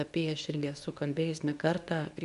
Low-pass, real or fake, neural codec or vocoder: 10.8 kHz; fake; codec, 24 kHz, 0.9 kbps, WavTokenizer, medium speech release version 2